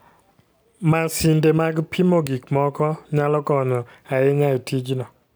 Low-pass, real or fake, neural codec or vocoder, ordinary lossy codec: none; real; none; none